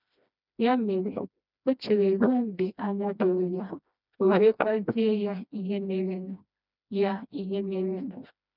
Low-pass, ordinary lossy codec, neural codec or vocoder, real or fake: 5.4 kHz; none; codec, 16 kHz, 1 kbps, FreqCodec, smaller model; fake